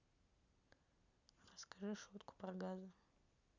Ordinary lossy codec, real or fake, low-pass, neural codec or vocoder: none; real; 7.2 kHz; none